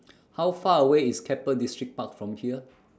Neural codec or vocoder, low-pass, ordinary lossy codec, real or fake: none; none; none; real